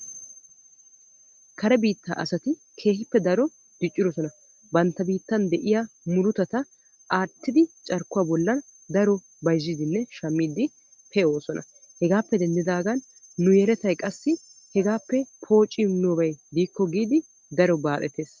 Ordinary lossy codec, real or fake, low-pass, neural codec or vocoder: Opus, 24 kbps; real; 7.2 kHz; none